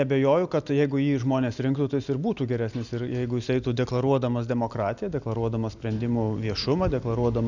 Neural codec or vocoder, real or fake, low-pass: none; real; 7.2 kHz